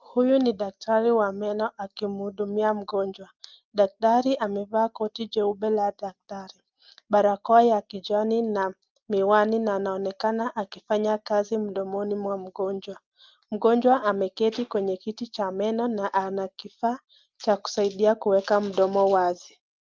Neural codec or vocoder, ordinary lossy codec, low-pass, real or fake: none; Opus, 32 kbps; 7.2 kHz; real